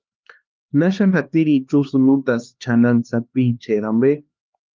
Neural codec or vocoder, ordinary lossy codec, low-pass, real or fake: codec, 16 kHz, 2 kbps, X-Codec, HuBERT features, trained on LibriSpeech; Opus, 32 kbps; 7.2 kHz; fake